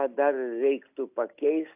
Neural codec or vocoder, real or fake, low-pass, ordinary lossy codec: none; real; 3.6 kHz; AAC, 32 kbps